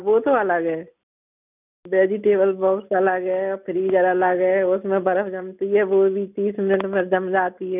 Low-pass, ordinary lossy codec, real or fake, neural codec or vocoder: 3.6 kHz; none; real; none